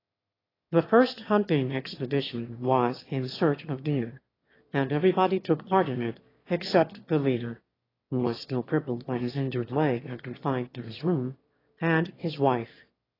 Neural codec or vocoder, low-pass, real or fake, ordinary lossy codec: autoencoder, 22.05 kHz, a latent of 192 numbers a frame, VITS, trained on one speaker; 5.4 kHz; fake; AAC, 24 kbps